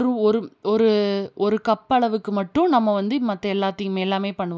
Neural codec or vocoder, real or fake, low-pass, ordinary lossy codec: none; real; none; none